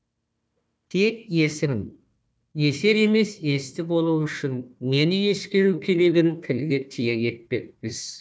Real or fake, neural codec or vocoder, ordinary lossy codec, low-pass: fake; codec, 16 kHz, 1 kbps, FunCodec, trained on Chinese and English, 50 frames a second; none; none